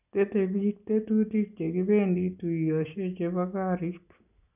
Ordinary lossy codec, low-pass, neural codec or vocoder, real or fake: none; 3.6 kHz; none; real